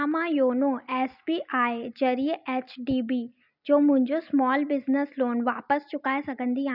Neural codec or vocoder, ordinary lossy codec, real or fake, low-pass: none; none; real; 5.4 kHz